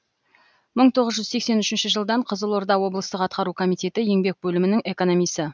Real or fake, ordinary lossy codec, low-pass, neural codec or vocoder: real; none; none; none